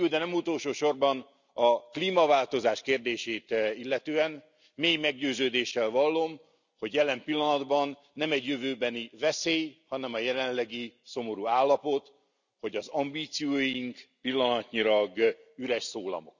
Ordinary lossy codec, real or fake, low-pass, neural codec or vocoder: none; real; 7.2 kHz; none